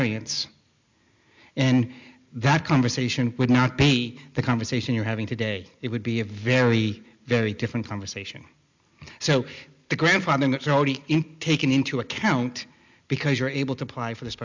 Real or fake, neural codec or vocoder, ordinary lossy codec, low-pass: real; none; MP3, 64 kbps; 7.2 kHz